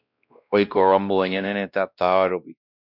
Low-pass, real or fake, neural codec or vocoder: 5.4 kHz; fake; codec, 16 kHz, 1 kbps, X-Codec, WavLM features, trained on Multilingual LibriSpeech